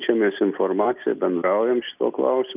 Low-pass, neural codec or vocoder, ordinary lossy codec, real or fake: 3.6 kHz; none; Opus, 24 kbps; real